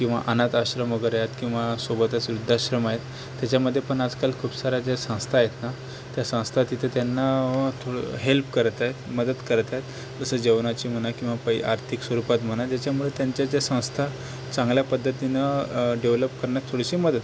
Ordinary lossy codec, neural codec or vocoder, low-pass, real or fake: none; none; none; real